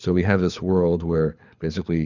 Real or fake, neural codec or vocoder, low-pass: fake; codec, 24 kHz, 6 kbps, HILCodec; 7.2 kHz